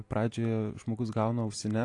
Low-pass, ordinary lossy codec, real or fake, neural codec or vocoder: 10.8 kHz; AAC, 48 kbps; real; none